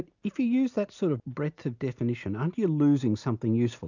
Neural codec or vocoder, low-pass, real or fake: none; 7.2 kHz; real